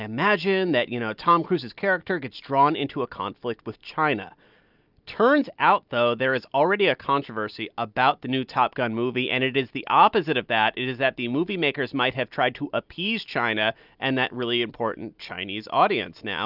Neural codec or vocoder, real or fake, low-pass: none; real; 5.4 kHz